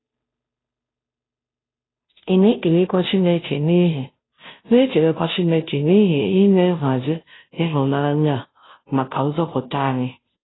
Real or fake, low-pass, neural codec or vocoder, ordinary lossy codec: fake; 7.2 kHz; codec, 16 kHz, 0.5 kbps, FunCodec, trained on Chinese and English, 25 frames a second; AAC, 16 kbps